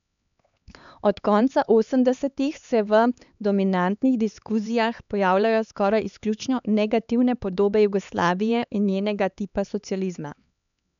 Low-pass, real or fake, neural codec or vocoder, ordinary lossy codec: 7.2 kHz; fake; codec, 16 kHz, 4 kbps, X-Codec, HuBERT features, trained on LibriSpeech; none